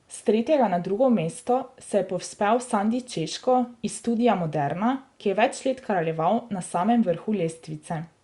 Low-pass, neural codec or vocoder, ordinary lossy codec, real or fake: 10.8 kHz; none; Opus, 64 kbps; real